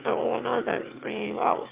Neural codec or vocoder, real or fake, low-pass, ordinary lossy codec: autoencoder, 22.05 kHz, a latent of 192 numbers a frame, VITS, trained on one speaker; fake; 3.6 kHz; Opus, 32 kbps